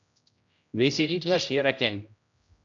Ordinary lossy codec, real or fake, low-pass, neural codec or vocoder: MP3, 64 kbps; fake; 7.2 kHz; codec, 16 kHz, 0.5 kbps, X-Codec, HuBERT features, trained on general audio